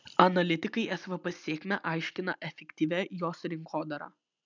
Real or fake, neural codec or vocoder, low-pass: real; none; 7.2 kHz